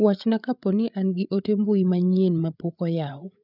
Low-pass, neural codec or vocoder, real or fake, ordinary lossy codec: 5.4 kHz; codec, 16 kHz, 8 kbps, FreqCodec, larger model; fake; none